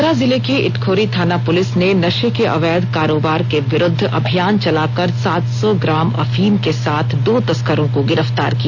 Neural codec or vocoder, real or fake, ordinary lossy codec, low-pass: none; real; AAC, 48 kbps; 7.2 kHz